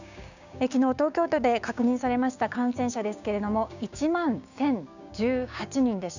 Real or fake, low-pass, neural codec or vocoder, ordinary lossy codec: fake; 7.2 kHz; codec, 16 kHz, 6 kbps, DAC; none